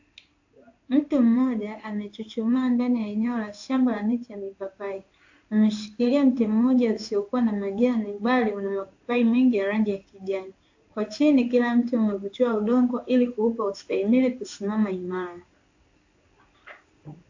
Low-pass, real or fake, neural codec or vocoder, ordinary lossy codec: 7.2 kHz; fake; codec, 16 kHz in and 24 kHz out, 1 kbps, XY-Tokenizer; AAC, 48 kbps